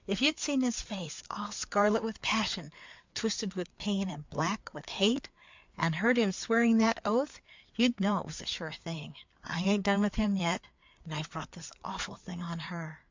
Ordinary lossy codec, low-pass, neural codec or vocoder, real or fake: MP3, 64 kbps; 7.2 kHz; codec, 16 kHz, 4 kbps, FreqCodec, larger model; fake